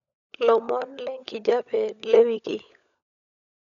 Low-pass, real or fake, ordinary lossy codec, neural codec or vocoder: 7.2 kHz; fake; none; codec, 16 kHz, 16 kbps, FunCodec, trained on LibriTTS, 50 frames a second